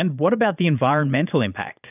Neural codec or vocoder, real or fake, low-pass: vocoder, 22.05 kHz, 80 mel bands, Vocos; fake; 3.6 kHz